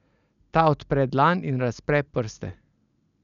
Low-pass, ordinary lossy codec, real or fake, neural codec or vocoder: 7.2 kHz; none; real; none